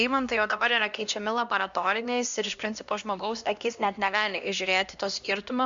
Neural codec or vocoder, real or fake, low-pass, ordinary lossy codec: codec, 16 kHz, 1 kbps, X-Codec, HuBERT features, trained on LibriSpeech; fake; 7.2 kHz; Opus, 64 kbps